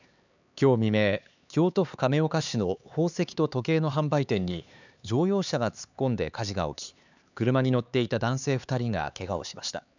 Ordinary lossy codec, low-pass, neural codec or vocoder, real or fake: none; 7.2 kHz; codec, 16 kHz, 4 kbps, X-Codec, HuBERT features, trained on LibriSpeech; fake